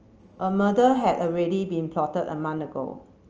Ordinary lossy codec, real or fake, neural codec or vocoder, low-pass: Opus, 24 kbps; real; none; 7.2 kHz